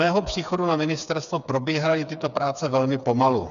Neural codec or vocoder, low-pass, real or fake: codec, 16 kHz, 4 kbps, FreqCodec, smaller model; 7.2 kHz; fake